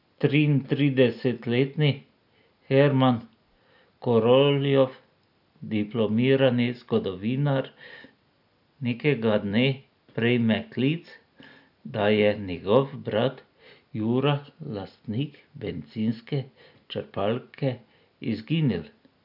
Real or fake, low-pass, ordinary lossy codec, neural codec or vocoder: real; 5.4 kHz; none; none